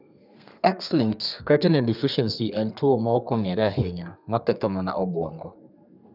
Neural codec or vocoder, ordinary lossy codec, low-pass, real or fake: codec, 32 kHz, 1.9 kbps, SNAC; none; 5.4 kHz; fake